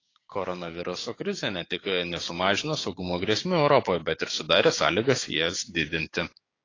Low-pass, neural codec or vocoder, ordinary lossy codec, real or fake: 7.2 kHz; codec, 16 kHz, 6 kbps, DAC; AAC, 32 kbps; fake